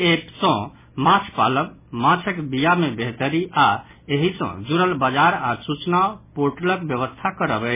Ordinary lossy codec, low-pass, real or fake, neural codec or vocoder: MP3, 16 kbps; 3.6 kHz; real; none